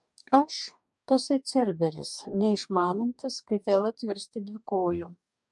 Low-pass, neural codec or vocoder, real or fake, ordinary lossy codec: 10.8 kHz; codec, 44.1 kHz, 2.6 kbps, DAC; fake; MP3, 96 kbps